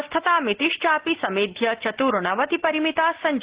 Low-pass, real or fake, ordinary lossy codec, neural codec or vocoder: 3.6 kHz; real; Opus, 16 kbps; none